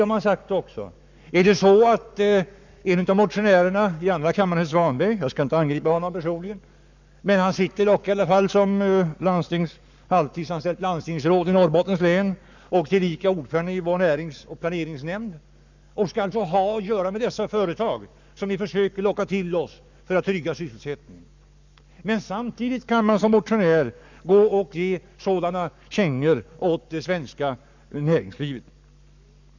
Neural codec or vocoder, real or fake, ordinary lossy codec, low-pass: codec, 44.1 kHz, 7.8 kbps, Pupu-Codec; fake; none; 7.2 kHz